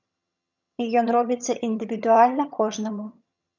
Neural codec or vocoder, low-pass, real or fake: vocoder, 22.05 kHz, 80 mel bands, HiFi-GAN; 7.2 kHz; fake